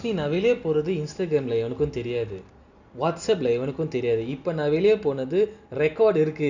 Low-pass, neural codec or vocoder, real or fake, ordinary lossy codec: 7.2 kHz; none; real; none